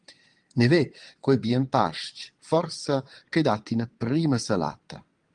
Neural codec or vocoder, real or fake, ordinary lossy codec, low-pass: vocoder, 22.05 kHz, 80 mel bands, WaveNeXt; fake; Opus, 24 kbps; 9.9 kHz